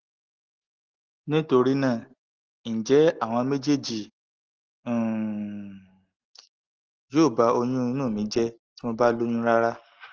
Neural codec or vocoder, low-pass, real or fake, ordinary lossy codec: none; 7.2 kHz; real; Opus, 16 kbps